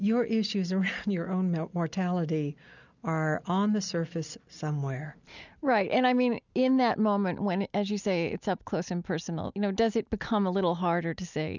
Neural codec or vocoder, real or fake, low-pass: none; real; 7.2 kHz